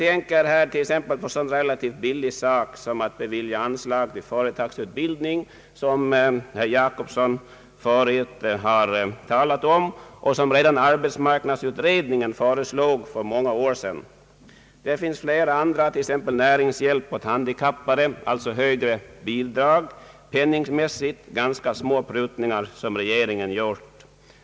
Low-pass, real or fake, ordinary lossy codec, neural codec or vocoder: none; real; none; none